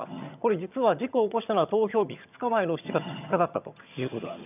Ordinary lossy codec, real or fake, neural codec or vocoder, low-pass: none; fake; vocoder, 22.05 kHz, 80 mel bands, HiFi-GAN; 3.6 kHz